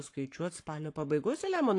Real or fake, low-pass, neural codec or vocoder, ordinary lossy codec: fake; 10.8 kHz; codec, 44.1 kHz, 7.8 kbps, Pupu-Codec; AAC, 48 kbps